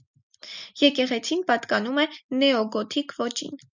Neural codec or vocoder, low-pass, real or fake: none; 7.2 kHz; real